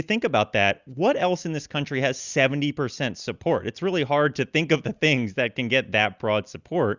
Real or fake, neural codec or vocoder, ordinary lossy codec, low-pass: real; none; Opus, 64 kbps; 7.2 kHz